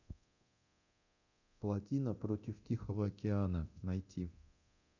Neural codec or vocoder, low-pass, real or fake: codec, 24 kHz, 0.9 kbps, DualCodec; 7.2 kHz; fake